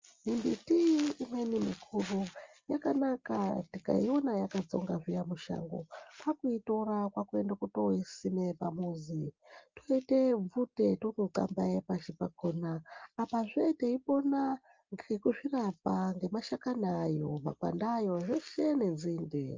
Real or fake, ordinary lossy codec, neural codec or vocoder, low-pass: real; Opus, 32 kbps; none; 7.2 kHz